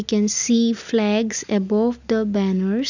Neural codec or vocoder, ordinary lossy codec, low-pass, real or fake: none; none; 7.2 kHz; real